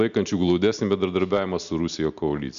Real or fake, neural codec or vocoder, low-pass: real; none; 7.2 kHz